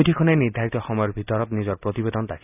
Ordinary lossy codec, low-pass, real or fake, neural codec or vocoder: none; 3.6 kHz; real; none